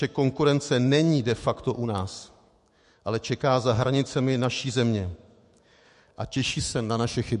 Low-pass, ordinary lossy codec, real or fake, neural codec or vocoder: 14.4 kHz; MP3, 48 kbps; fake; autoencoder, 48 kHz, 128 numbers a frame, DAC-VAE, trained on Japanese speech